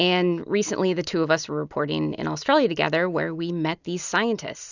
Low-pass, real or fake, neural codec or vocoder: 7.2 kHz; real; none